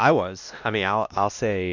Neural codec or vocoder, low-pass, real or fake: codec, 16 kHz, 1 kbps, X-Codec, WavLM features, trained on Multilingual LibriSpeech; 7.2 kHz; fake